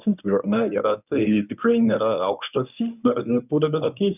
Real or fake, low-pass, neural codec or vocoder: fake; 3.6 kHz; codec, 24 kHz, 0.9 kbps, WavTokenizer, medium speech release version 1